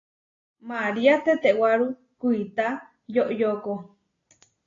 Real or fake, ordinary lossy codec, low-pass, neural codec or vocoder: real; AAC, 32 kbps; 7.2 kHz; none